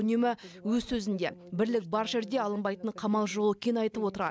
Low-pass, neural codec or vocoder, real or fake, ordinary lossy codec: none; none; real; none